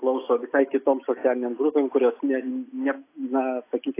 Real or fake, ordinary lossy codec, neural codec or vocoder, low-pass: real; AAC, 24 kbps; none; 3.6 kHz